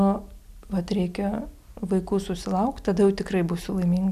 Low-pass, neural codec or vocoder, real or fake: 14.4 kHz; none; real